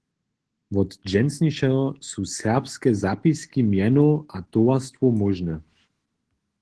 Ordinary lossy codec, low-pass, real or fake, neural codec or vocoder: Opus, 16 kbps; 10.8 kHz; fake; autoencoder, 48 kHz, 128 numbers a frame, DAC-VAE, trained on Japanese speech